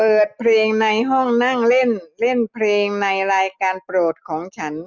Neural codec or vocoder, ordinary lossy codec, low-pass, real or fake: none; none; 7.2 kHz; real